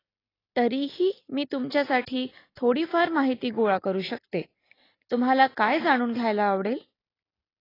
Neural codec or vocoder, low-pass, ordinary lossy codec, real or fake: none; 5.4 kHz; AAC, 24 kbps; real